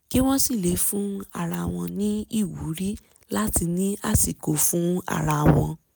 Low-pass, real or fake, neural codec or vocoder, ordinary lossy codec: none; real; none; none